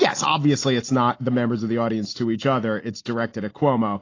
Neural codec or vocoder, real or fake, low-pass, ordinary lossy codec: none; real; 7.2 kHz; AAC, 32 kbps